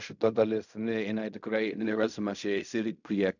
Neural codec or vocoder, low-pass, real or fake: codec, 16 kHz in and 24 kHz out, 0.4 kbps, LongCat-Audio-Codec, fine tuned four codebook decoder; 7.2 kHz; fake